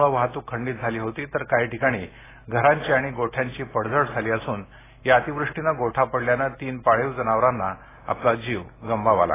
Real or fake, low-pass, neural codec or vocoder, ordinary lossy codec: real; 3.6 kHz; none; AAC, 16 kbps